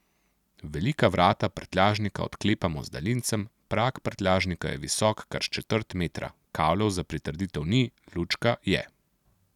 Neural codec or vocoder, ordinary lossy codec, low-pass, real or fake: none; none; 19.8 kHz; real